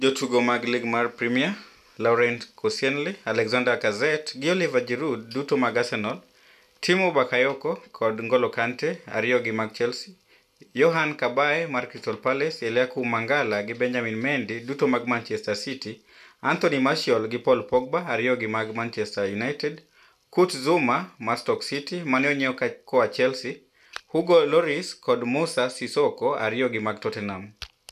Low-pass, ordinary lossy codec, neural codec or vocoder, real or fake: 14.4 kHz; none; none; real